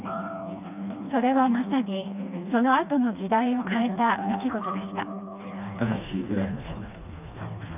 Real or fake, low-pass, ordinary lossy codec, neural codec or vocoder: fake; 3.6 kHz; none; codec, 16 kHz, 2 kbps, FreqCodec, smaller model